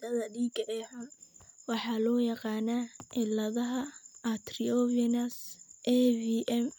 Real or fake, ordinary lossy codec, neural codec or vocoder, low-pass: real; none; none; none